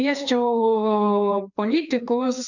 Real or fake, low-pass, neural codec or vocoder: fake; 7.2 kHz; codec, 16 kHz, 2 kbps, FreqCodec, larger model